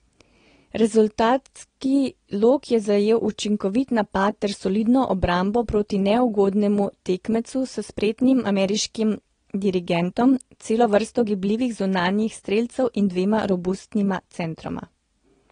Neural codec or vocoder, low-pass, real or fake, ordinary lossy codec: none; 9.9 kHz; real; AAC, 32 kbps